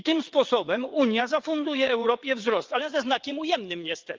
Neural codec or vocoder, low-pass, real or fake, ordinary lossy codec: vocoder, 22.05 kHz, 80 mel bands, WaveNeXt; 7.2 kHz; fake; Opus, 32 kbps